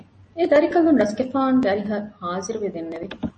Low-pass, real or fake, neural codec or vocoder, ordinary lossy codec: 10.8 kHz; real; none; MP3, 32 kbps